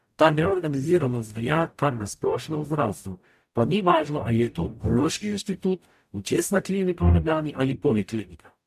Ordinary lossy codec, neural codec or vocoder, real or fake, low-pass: AAC, 96 kbps; codec, 44.1 kHz, 0.9 kbps, DAC; fake; 14.4 kHz